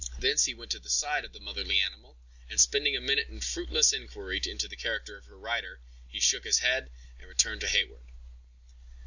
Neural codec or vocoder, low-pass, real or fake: none; 7.2 kHz; real